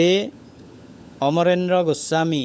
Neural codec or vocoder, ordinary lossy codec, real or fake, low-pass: codec, 16 kHz, 16 kbps, FunCodec, trained on LibriTTS, 50 frames a second; none; fake; none